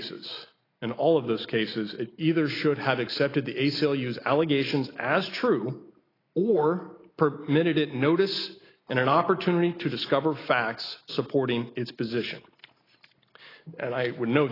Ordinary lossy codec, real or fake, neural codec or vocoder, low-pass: AAC, 24 kbps; real; none; 5.4 kHz